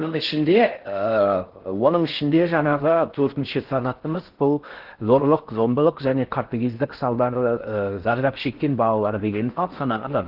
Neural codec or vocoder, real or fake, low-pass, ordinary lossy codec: codec, 16 kHz in and 24 kHz out, 0.6 kbps, FocalCodec, streaming, 4096 codes; fake; 5.4 kHz; Opus, 16 kbps